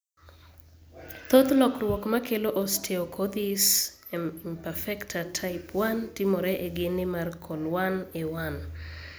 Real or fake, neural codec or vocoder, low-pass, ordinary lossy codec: real; none; none; none